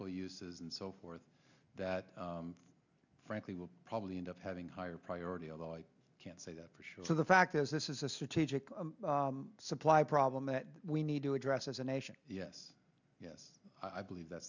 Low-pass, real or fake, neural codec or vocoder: 7.2 kHz; real; none